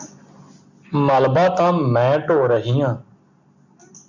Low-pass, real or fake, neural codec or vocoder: 7.2 kHz; real; none